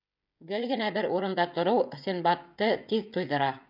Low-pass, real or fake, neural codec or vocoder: 5.4 kHz; fake; codec, 16 kHz, 16 kbps, FreqCodec, smaller model